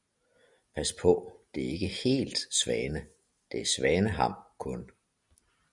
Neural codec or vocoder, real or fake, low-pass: none; real; 10.8 kHz